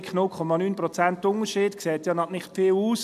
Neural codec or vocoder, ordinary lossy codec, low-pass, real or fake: none; none; 14.4 kHz; real